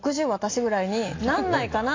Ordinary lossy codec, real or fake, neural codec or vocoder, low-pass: AAC, 32 kbps; real; none; 7.2 kHz